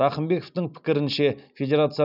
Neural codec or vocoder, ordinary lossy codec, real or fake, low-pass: none; none; real; 5.4 kHz